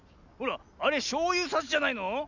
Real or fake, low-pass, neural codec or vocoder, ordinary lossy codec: real; 7.2 kHz; none; none